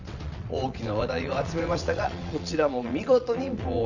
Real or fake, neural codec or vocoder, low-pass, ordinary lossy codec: fake; vocoder, 22.05 kHz, 80 mel bands, WaveNeXt; 7.2 kHz; none